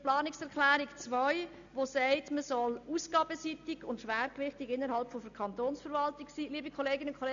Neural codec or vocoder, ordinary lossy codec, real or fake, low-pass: none; AAC, 64 kbps; real; 7.2 kHz